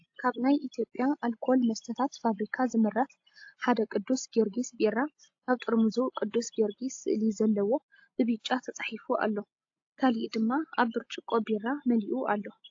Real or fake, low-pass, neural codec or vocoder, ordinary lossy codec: real; 7.2 kHz; none; AAC, 48 kbps